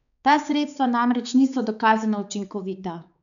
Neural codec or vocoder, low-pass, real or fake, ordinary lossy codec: codec, 16 kHz, 4 kbps, X-Codec, HuBERT features, trained on balanced general audio; 7.2 kHz; fake; none